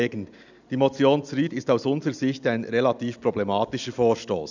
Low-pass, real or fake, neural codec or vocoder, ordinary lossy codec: 7.2 kHz; real; none; none